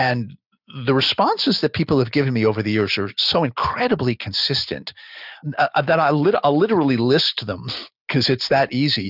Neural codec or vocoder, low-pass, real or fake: none; 5.4 kHz; real